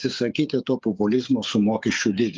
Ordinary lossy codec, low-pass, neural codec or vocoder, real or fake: Opus, 32 kbps; 7.2 kHz; codec, 16 kHz, 8 kbps, FreqCodec, smaller model; fake